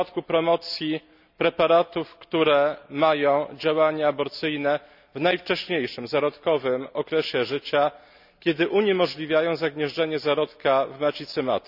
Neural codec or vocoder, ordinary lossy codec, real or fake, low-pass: none; none; real; 5.4 kHz